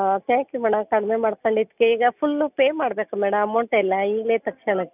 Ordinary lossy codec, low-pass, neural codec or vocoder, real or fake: none; 3.6 kHz; none; real